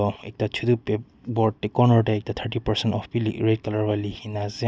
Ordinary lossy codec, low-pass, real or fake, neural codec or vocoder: none; none; real; none